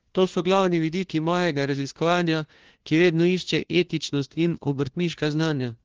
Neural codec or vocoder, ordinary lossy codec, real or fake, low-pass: codec, 16 kHz, 1 kbps, FunCodec, trained on LibriTTS, 50 frames a second; Opus, 16 kbps; fake; 7.2 kHz